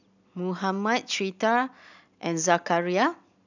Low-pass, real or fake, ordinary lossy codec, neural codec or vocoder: 7.2 kHz; real; none; none